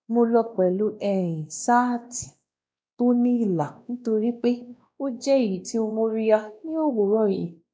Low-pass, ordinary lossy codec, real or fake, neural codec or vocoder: none; none; fake; codec, 16 kHz, 1 kbps, X-Codec, WavLM features, trained on Multilingual LibriSpeech